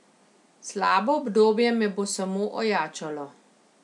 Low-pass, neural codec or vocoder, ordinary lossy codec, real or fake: 10.8 kHz; none; none; real